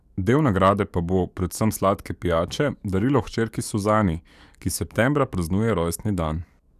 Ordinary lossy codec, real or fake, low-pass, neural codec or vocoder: none; fake; 14.4 kHz; codec, 44.1 kHz, 7.8 kbps, DAC